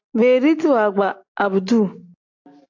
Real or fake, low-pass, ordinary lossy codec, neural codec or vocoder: real; 7.2 kHz; AAC, 48 kbps; none